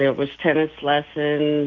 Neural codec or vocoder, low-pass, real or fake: none; 7.2 kHz; real